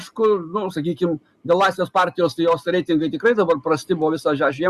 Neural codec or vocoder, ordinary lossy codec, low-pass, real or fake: none; Opus, 64 kbps; 14.4 kHz; real